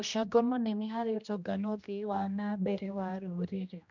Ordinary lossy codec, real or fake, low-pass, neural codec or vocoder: none; fake; 7.2 kHz; codec, 16 kHz, 1 kbps, X-Codec, HuBERT features, trained on general audio